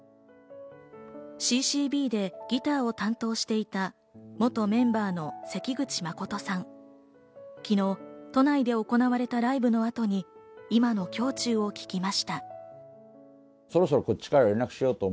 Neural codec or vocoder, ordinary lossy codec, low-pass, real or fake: none; none; none; real